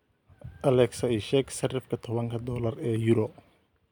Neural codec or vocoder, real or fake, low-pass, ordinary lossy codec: none; real; none; none